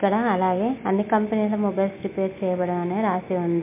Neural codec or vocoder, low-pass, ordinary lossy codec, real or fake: none; 3.6 kHz; MP3, 24 kbps; real